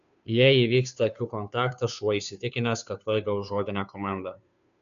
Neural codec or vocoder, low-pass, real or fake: codec, 16 kHz, 2 kbps, FunCodec, trained on Chinese and English, 25 frames a second; 7.2 kHz; fake